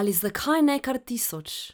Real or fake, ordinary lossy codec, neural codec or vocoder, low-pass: fake; none; vocoder, 44.1 kHz, 128 mel bands every 256 samples, BigVGAN v2; none